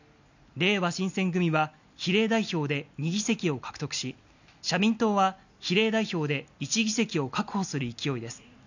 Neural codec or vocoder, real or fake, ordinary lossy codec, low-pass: none; real; none; 7.2 kHz